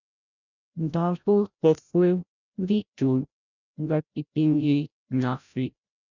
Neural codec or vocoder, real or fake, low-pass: codec, 16 kHz, 0.5 kbps, FreqCodec, larger model; fake; 7.2 kHz